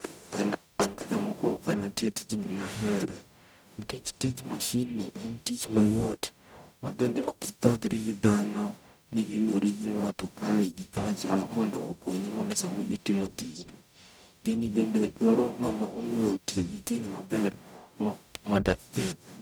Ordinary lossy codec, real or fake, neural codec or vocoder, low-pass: none; fake; codec, 44.1 kHz, 0.9 kbps, DAC; none